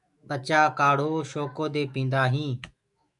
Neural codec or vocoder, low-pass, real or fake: autoencoder, 48 kHz, 128 numbers a frame, DAC-VAE, trained on Japanese speech; 10.8 kHz; fake